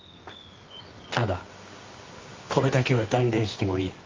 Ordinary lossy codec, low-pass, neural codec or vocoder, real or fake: Opus, 32 kbps; 7.2 kHz; codec, 24 kHz, 0.9 kbps, WavTokenizer, medium music audio release; fake